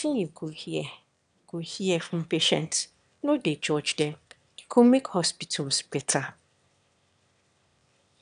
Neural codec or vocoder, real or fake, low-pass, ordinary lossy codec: autoencoder, 22.05 kHz, a latent of 192 numbers a frame, VITS, trained on one speaker; fake; 9.9 kHz; none